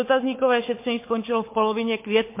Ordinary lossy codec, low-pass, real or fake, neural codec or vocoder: MP3, 24 kbps; 3.6 kHz; fake; codec, 16 kHz, 4 kbps, FunCodec, trained on Chinese and English, 50 frames a second